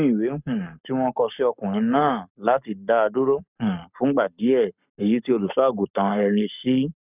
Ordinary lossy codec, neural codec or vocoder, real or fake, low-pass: none; codec, 44.1 kHz, 7.8 kbps, Pupu-Codec; fake; 3.6 kHz